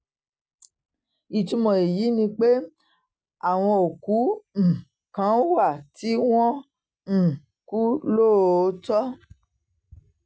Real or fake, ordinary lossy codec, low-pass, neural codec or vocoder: real; none; none; none